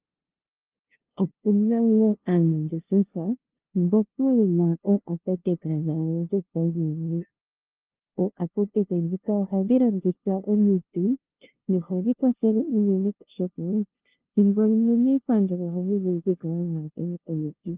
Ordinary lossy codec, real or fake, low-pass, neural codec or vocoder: Opus, 16 kbps; fake; 3.6 kHz; codec, 16 kHz, 0.5 kbps, FunCodec, trained on LibriTTS, 25 frames a second